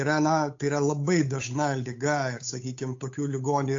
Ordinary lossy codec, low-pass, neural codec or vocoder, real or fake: AAC, 48 kbps; 7.2 kHz; codec, 16 kHz, 8 kbps, FunCodec, trained on Chinese and English, 25 frames a second; fake